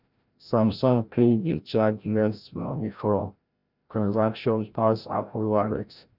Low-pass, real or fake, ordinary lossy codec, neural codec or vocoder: 5.4 kHz; fake; none; codec, 16 kHz, 0.5 kbps, FreqCodec, larger model